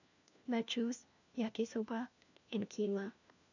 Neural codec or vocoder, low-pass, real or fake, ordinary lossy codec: codec, 16 kHz, 1 kbps, FunCodec, trained on LibriTTS, 50 frames a second; 7.2 kHz; fake; none